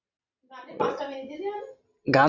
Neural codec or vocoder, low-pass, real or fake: none; 7.2 kHz; real